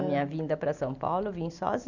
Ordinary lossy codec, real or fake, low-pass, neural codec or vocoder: none; real; 7.2 kHz; none